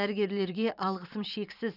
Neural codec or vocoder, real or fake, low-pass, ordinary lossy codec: vocoder, 44.1 kHz, 128 mel bands every 512 samples, BigVGAN v2; fake; 5.4 kHz; none